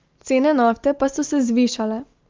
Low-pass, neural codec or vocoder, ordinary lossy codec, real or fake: 7.2 kHz; none; Opus, 32 kbps; real